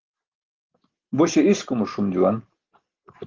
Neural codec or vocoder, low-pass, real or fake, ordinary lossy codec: none; 7.2 kHz; real; Opus, 16 kbps